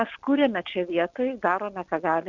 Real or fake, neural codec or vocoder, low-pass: real; none; 7.2 kHz